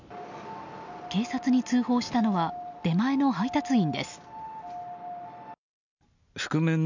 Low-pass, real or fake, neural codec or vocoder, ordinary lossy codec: 7.2 kHz; real; none; none